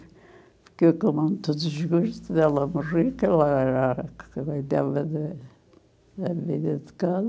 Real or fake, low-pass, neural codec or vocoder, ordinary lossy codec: real; none; none; none